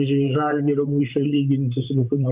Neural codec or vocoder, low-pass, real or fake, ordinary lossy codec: vocoder, 44.1 kHz, 128 mel bands, Pupu-Vocoder; 3.6 kHz; fake; Opus, 64 kbps